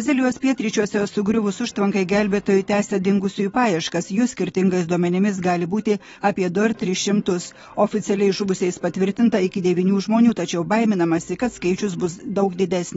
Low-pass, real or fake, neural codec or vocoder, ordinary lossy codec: 9.9 kHz; real; none; AAC, 24 kbps